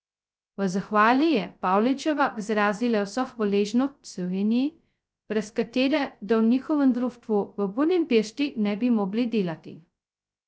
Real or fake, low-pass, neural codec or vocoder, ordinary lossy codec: fake; none; codec, 16 kHz, 0.2 kbps, FocalCodec; none